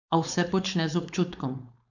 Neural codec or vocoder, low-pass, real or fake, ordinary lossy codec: codec, 16 kHz, 4.8 kbps, FACodec; 7.2 kHz; fake; none